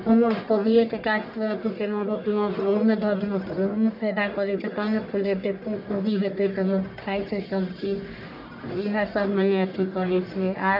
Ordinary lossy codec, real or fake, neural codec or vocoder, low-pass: none; fake; codec, 44.1 kHz, 1.7 kbps, Pupu-Codec; 5.4 kHz